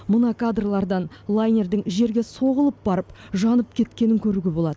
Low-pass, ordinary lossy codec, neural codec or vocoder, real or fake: none; none; none; real